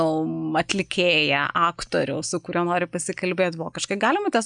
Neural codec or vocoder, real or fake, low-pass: none; real; 9.9 kHz